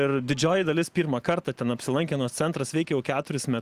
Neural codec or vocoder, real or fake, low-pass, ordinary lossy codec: none; real; 14.4 kHz; Opus, 24 kbps